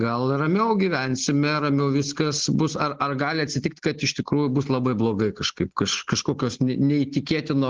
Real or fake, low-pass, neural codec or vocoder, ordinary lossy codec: real; 7.2 kHz; none; Opus, 16 kbps